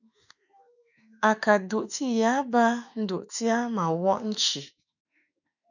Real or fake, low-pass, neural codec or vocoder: fake; 7.2 kHz; autoencoder, 48 kHz, 32 numbers a frame, DAC-VAE, trained on Japanese speech